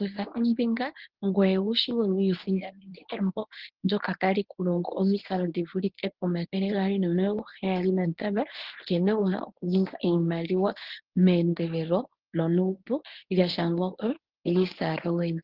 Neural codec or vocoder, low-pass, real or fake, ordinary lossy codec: codec, 24 kHz, 0.9 kbps, WavTokenizer, medium speech release version 1; 5.4 kHz; fake; Opus, 16 kbps